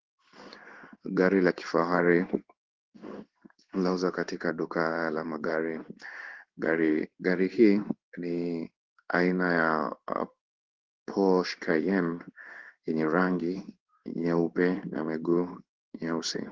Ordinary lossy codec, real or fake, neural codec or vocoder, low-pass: Opus, 16 kbps; fake; codec, 16 kHz in and 24 kHz out, 1 kbps, XY-Tokenizer; 7.2 kHz